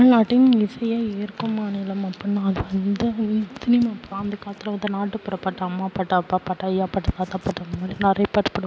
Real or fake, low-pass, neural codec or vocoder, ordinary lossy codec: real; none; none; none